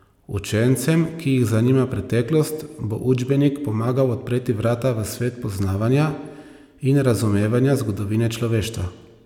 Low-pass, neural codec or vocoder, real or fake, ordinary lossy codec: 19.8 kHz; none; real; none